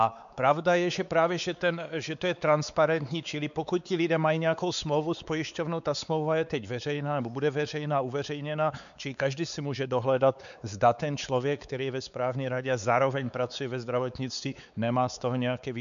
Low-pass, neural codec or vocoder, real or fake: 7.2 kHz; codec, 16 kHz, 4 kbps, X-Codec, WavLM features, trained on Multilingual LibriSpeech; fake